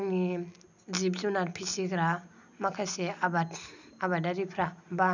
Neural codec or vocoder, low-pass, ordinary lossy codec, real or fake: none; 7.2 kHz; none; real